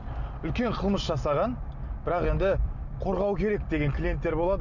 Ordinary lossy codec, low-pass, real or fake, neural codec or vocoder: none; 7.2 kHz; real; none